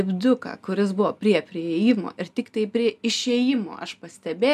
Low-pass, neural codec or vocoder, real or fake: 14.4 kHz; none; real